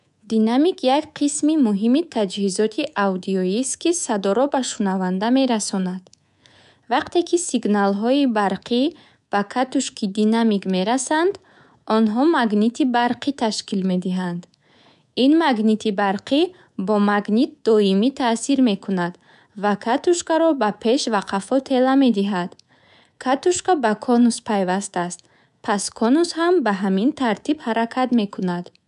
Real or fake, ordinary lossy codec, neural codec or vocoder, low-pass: fake; none; codec, 24 kHz, 3.1 kbps, DualCodec; 10.8 kHz